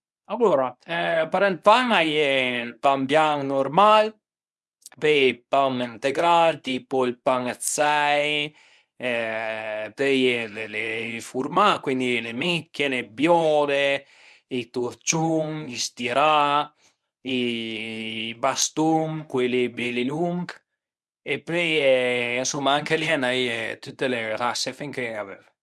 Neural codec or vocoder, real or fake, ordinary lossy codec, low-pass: codec, 24 kHz, 0.9 kbps, WavTokenizer, medium speech release version 1; fake; none; none